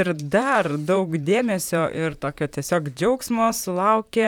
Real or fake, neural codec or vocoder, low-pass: fake; vocoder, 44.1 kHz, 128 mel bands, Pupu-Vocoder; 19.8 kHz